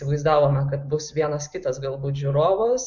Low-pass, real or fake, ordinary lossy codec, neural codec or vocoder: 7.2 kHz; real; MP3, 64 kbps; none